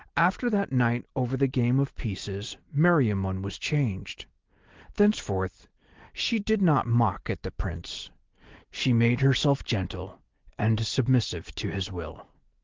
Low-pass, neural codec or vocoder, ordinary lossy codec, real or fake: 7.2 kHz; none; Opus, 16 kbps; real